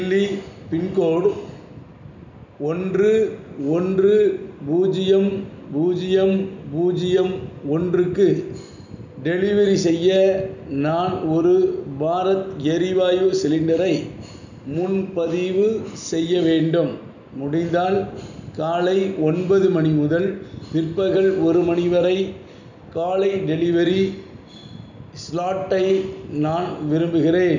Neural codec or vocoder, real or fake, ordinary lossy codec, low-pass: none; real; none; 7.2 kHz